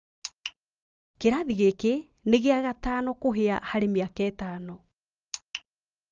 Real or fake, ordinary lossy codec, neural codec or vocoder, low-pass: real; Opus, 24 kbps; none; 7.2 kHz